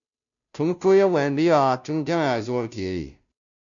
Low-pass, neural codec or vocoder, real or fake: 7.2 kHz; codec, 16 kHz, 0.5 kbps, FunCodec, trained on Chinese and English, 25 frames a second; fake